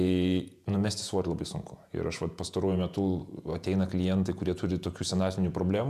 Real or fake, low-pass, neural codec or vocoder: fake; 14.4 kHz; vocoder, 48 kHz, 128 mel bands, Vocos